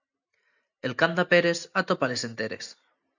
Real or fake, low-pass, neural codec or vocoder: real; 7.2 kHz; none